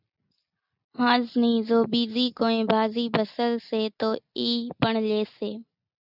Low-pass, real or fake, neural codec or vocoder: 5.4 kHz; real; none